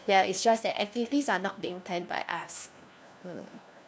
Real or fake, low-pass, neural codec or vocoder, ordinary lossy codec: fake; none; codec, 16 kHz, 1 kbps, FunCodec, trained on LibriTTS, 50 frames a second; none